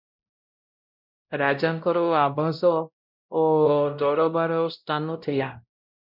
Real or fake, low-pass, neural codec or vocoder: fake; 5.4 kHz; codec, 16 kHz, 0.5 kbps, X-Codec, WavLM features, trained on Multilingual LibriSpeech